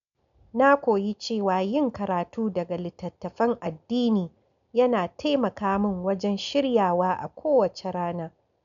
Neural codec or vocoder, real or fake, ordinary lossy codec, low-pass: none; real; none; 7.2 kHz